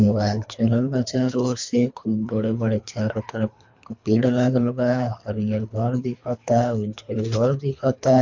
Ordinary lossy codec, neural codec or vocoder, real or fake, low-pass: MP3, 48 kbps; codec, 24 kHz, 3 kbps, HILCodec; fake; 7.2 kHz